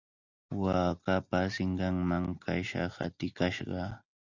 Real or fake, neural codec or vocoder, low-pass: real; none; 7.2 kHz